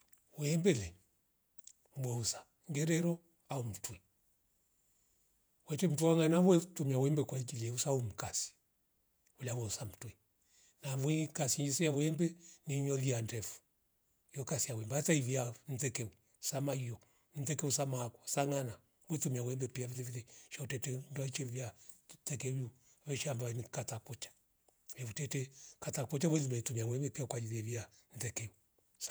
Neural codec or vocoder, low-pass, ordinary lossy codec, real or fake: vocoder, 48 kHz, 128 mel bands, Vocos; none; none; fake